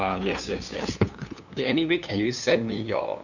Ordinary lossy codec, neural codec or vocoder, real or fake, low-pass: none; codec, 16 kHz, 2 kbps, FunCodec, trained on LibriTTS, 25 frames a second; fake; 7.2 kHz